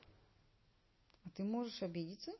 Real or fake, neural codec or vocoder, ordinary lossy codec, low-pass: real; none; MP3, 24 kbps; 7.2 kHz